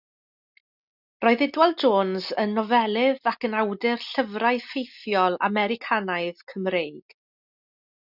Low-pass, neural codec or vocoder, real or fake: 5.4 kHz; none; real